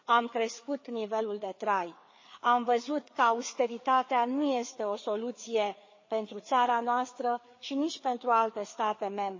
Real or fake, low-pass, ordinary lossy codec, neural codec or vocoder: fake; 7.2 kHz; MP3, 32 kbps; codec, 16 kHz, 4 kbps, FreqCodec, larger model